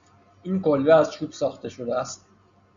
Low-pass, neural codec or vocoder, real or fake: 7.2 kHz; none; real